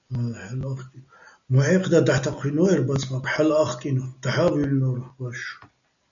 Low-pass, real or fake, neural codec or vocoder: 7.2 kHz; real; none